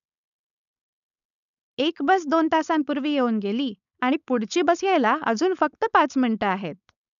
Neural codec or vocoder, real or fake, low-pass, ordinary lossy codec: codec, 16 kHz, 4.8 kbps, FACodec; fake; 7.2 kHz; none